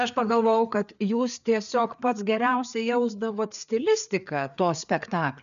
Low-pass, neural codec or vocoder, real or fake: 7.2 kHz; codec, 16 kHz, 4 kbps, FreqCodec, larger model; fake